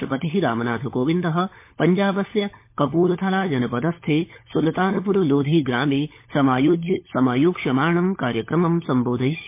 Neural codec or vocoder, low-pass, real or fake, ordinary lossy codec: codec, 16 kHz, 16 kbps, FunCodec, trained on LibriTTS, 50 frames a second; 3.6 kHz; fake; MP3, 24 kbps